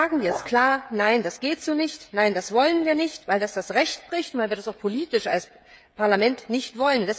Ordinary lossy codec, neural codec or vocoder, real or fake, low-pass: none; codec, 16 kHz, 16 kbps, FreqCodec, smaller model; fake; none